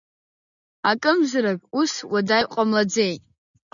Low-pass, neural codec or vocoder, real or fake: 7.2 kHz; none; real